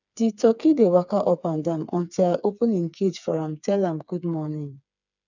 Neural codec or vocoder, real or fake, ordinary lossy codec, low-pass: codec, 16 kHz, 4 kbps, FreqCodec, smaller model; fake; none; 7.2 kHz